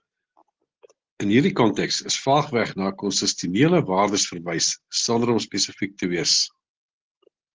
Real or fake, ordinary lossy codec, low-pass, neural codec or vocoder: real; Opus, 16 kbps; 7.2 kHz; none